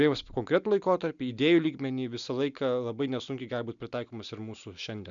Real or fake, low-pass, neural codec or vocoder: real; 7.2 kHz; none